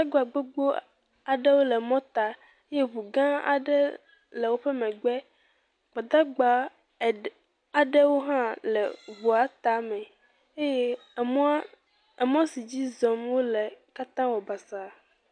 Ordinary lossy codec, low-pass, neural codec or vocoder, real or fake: MP3, 64 kbps; 9.9 kHz; none; real